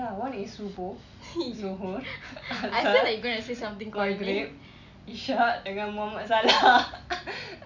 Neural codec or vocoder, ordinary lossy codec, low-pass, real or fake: none; none; 7.2 kHz; real